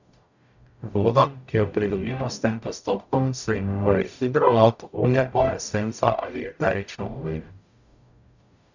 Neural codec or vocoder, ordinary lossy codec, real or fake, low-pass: codec, 44.1 kHz, 0.9 kbps, DAC; none; fake; 7.2 kHz